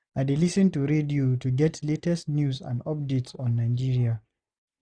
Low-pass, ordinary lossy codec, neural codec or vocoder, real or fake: 9.9 kHz; none; none; real